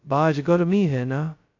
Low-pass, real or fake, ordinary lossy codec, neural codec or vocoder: 7.2 kHz; fake; AAC, 48 kbps; codec, 16 kHz, 0.2 kbps, FocalCodec